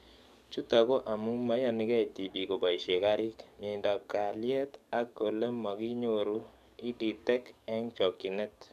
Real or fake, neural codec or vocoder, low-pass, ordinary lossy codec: fake; codec, 44.1 kHz, 7.8 kbps, DAC; 14.4 kHz; none